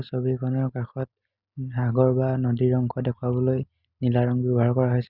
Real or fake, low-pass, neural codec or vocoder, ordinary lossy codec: real; 5.4 kHz; none; Opus, 64 kbps